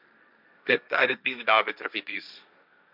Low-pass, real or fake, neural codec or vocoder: 5.4 kHz; fake; codec, 16 kHz, 1.1 kbps, Voila-Tokenizer